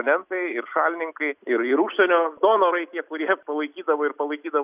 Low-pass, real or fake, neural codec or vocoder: 3.6 kHz; real; none